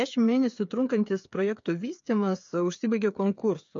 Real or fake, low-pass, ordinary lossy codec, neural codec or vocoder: fake; 7.2 kHz; MP3, 48 kbps; codec, 16 kHz, 8 kbps, FreqCodec, smaller model